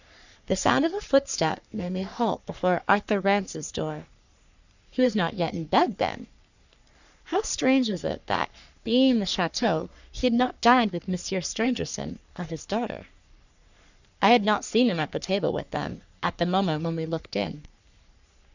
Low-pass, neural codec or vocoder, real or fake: 7.2 kHz; codec, 44.1 kHz, 3.4 kbps, Pupu-Codec; fake